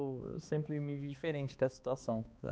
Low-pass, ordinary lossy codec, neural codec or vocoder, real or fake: none; none; codec, 16 kHz, 2 kbps, X-Codec, HuBERT features, trained on balanced general audio; fake